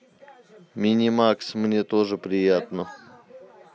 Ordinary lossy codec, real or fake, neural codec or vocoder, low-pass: none; real; none; none